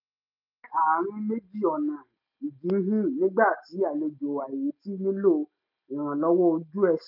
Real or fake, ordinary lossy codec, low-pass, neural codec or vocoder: real; none; 5.4 kHz; none